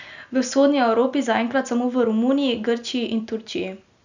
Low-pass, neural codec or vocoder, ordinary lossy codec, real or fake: 7.2 kHz; none; none; real